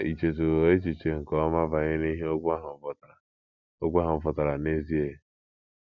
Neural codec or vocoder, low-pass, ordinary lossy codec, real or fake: none; 7.2 kHz; none; real